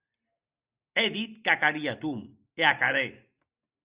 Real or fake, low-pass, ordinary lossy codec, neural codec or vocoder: real; 3.6 kHz; Opus, 64 kbps; none